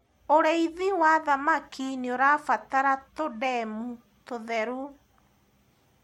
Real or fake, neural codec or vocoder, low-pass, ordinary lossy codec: real; none; 19.8 kHz; MP3, 64 kbps